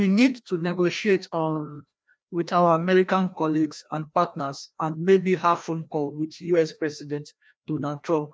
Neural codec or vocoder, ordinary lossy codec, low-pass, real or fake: codec, 16 kHz, 1 kbps, FreqCodec, larger model; none; none; fake